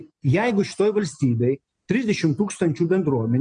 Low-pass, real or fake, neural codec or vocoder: 9.9 kHz; real; none